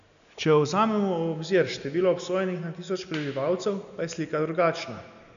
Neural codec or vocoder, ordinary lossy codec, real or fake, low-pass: none; none; real; 7.2 kHz